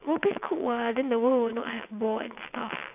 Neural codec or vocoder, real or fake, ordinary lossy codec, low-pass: vocoder, 22.05 kHz, 80 mel bands, WaveNeXt; fake; none; 3.6 kHz